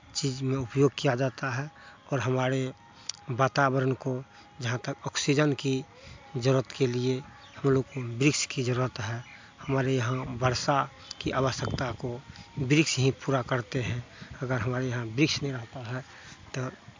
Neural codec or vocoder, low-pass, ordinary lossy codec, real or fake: none; 7.2 kHz; MP3, 64 kbps; real